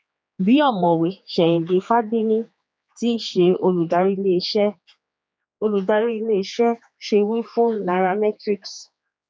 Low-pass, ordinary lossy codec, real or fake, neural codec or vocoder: none; none; fake; codec, 16 kHz, 4 kbps, X-Codec, HuBERT features, trained on general audio